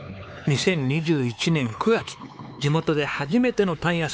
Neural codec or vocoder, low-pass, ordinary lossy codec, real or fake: codec, 16 kHz, 4 kbps, X-Codec, HuBERT features, trained on LibriSpeech; none; none; fake